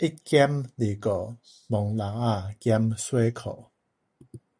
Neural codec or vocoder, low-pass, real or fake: none; 9.9 kHz; real